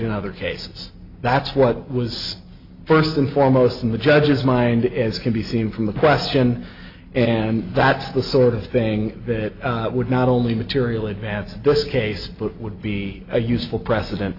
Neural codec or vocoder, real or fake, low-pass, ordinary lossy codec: none; real; 5.4 kHz; AAC, 32 kbps